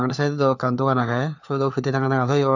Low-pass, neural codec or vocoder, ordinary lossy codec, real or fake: 7.2 kHz; codec, 16 kHz, 4 kbps, FunCodec, trained on LibriTTS, 50 frames a second; none; fake